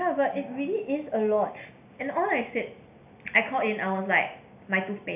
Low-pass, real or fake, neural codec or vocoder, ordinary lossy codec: 3.6 kHz; real; none; AAC, 32 kbps